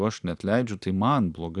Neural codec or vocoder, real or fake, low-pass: none; real; 10.8 kHz